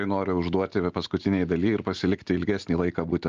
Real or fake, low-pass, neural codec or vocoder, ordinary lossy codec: real; 7.2 kHz; none; Opus, 24 kbps